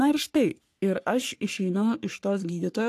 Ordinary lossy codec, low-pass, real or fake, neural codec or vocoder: AAC, 64 kbps; 14.4 kHz; fake; codec, 44.1 kHz, 3.4 kbps, Pupu-Codec